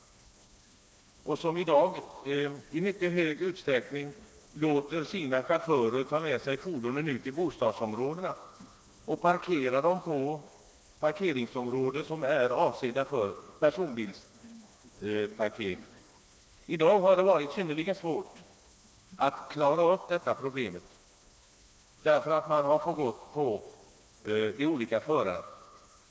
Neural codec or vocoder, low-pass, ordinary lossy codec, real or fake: codec, 16 kHz, 2 kbps, FreqCodec, smaller model; none; none; fake